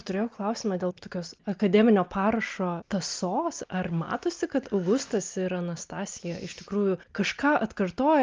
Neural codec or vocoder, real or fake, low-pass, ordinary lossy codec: none; real; 7.2 kHz; Opus, 24 kbps